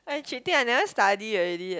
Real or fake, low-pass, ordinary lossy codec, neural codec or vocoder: real; none; none; none